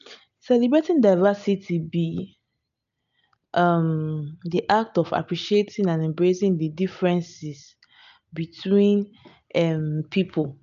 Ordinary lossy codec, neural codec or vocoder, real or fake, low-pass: none; none; real; 7.2 kHz